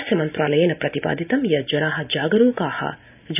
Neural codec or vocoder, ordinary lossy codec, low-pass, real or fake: none; none; 3.6 kHz; real